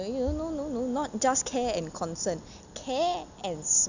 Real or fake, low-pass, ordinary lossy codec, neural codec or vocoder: real; 7.2 kHz; none; none